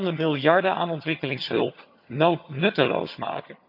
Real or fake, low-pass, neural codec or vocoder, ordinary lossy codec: fake; 5.4 kHz; vocoder, 22.05 kHz, 80 mel bands, HiFi-GAN; none